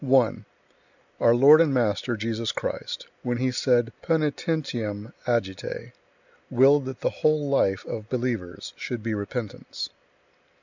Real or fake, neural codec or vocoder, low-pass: real; none; 7.2 kHz